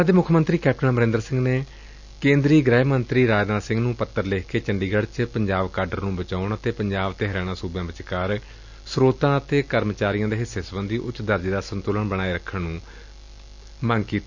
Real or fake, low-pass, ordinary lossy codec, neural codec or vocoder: real; 7.2 kHz; none; none